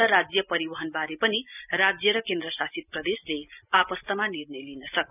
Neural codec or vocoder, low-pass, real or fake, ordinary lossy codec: none; 3.6 kHz; real; none